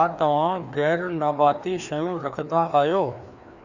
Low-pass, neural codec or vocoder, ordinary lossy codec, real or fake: 7.2 kHz; codec, 16 kHz, 2 kbps, FreqCodec, larger model; none; fake